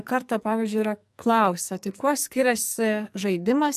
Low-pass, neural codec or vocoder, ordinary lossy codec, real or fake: 14.4 kHz; codec, 44.1 kHz, 2.6 kbps, SNAC; AAC, 96 kbps; fake